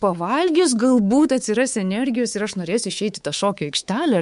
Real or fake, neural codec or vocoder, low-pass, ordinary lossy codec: fake; codec, 24 kHz, 3.1 kbps, DualCodec; 10.8 kHz; MP3, 64 kbps